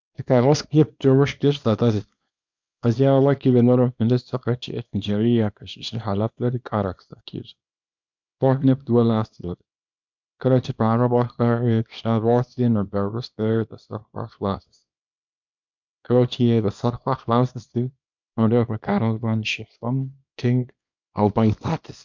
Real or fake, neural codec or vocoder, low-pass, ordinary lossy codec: fake; codec, 24 kHz, 0.9 kbps, WavTokenizer, small release; 7.2 kHz; MP3, 64 kbps